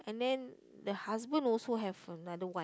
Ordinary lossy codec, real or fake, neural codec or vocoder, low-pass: none; real; none; none